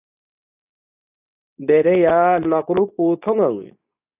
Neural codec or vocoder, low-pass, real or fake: codec, 24 kHz, 0.9 kbps, WavTokenizer, medium speech release version 2; 3.6 kHz; fake